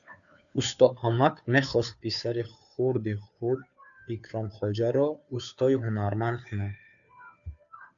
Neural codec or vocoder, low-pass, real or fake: codec, 16 kHz, 2 kbps, FunCodec, trained on Chinese and English, 25 frames a second; 7.2 kHz; fake